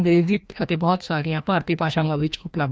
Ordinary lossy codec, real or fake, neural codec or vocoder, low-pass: none; fake; codec, 16 kHz, 1 kbps, FreqCodec, larger model; none